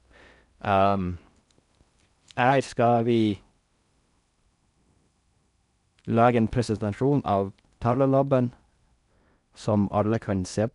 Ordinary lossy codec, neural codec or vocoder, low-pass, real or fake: none; codec, 16 kHz in and 24 kHz out, 0.6 kbps, FocalCodec, streaming, 4096 codes; 10.8 kHz; fake